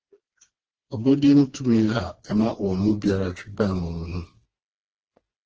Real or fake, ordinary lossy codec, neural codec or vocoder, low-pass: fake; Opus, 16 kbps; codec, 16 kHz, 2 kbps, FreqCodec, smaller model; 7.2 kHz